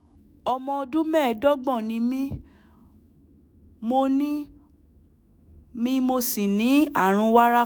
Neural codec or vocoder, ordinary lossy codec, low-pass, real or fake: autoencoder, 48 kHz, 128 numbers a frame, DAC-VAE, trained on Japanese speech; none; none; fake